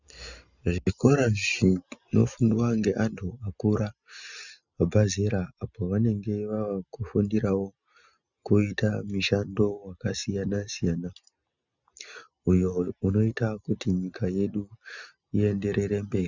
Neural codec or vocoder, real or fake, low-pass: none; real; 7.2 kHz